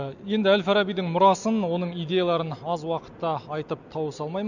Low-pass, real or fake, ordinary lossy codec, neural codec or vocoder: 7.2 kHz; real; none; none